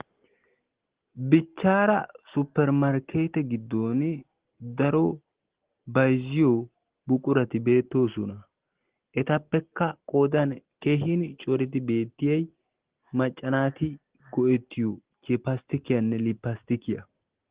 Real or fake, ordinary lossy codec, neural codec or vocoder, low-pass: real; Opus, 16 kbps; none; 3.6 kHz